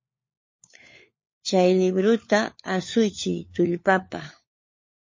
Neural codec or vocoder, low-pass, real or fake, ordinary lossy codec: codec, 16 kHz, 4 kbps, FunCodec, trained on LibriTTS, 50 frames a second; 7.2 kHz; fake; MP3, 32 kbps